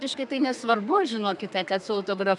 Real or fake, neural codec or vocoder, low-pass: fake; codec, 32 kHz, 1.9 kbps, SNAC; 10.8 kHz